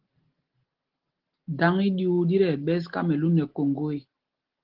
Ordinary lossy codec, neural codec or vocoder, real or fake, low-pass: Opus, 16 kbps; none; real; 5.4 kHz